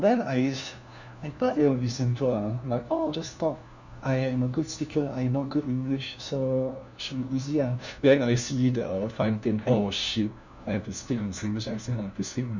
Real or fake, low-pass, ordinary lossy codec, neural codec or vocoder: fake; 7.2 kHz; none; codec, 16 kHz, 1 kbps, FunCodec, trained on LibriTTS, 50 frames a second